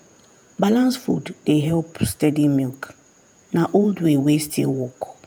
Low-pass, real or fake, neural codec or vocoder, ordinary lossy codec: none; fake; vocoder, 48 kHz, 128 mel bands, Vocos; none